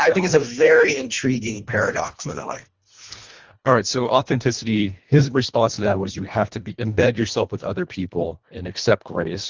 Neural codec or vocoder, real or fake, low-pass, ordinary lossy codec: codec, 24 kHz, 1.5 kbps, HILCodec; fake; 7.2 kHz; Opus, 32 kbps